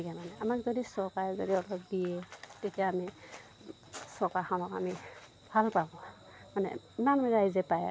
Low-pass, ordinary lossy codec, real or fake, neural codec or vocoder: none; none; real; none